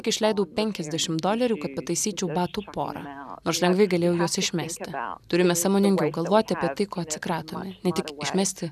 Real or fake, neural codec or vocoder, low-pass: real; none; 14.4 kHz